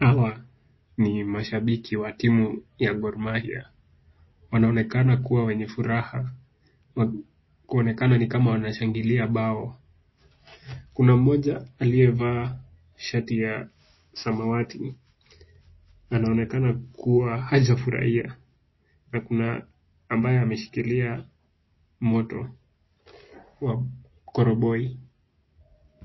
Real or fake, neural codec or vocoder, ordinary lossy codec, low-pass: real; none; MP3, 24 kbps; 7.2 kHz